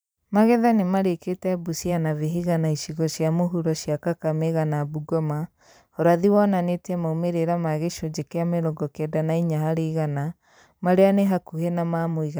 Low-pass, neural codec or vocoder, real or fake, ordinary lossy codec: none; vocoder, 44.1 kHz, 128 mel bands every 512 samples, BigVGAN v2; fake; none